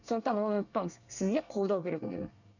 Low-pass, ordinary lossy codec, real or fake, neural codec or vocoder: 7.2 kHz; none; fake; codec, 24 kHz, 1 kbps, SNAC